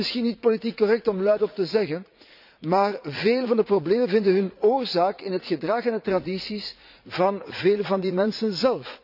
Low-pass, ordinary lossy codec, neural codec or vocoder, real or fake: 5.4 kHz; none; vocoder, 44.1 kHz, 80 mel bands, Vocos; fake